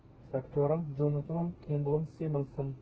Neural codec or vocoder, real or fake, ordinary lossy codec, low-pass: codec, 32 kHz, 1.9 kbps, SNAC; fake; Opus, 16 kbps; 7.2 kHz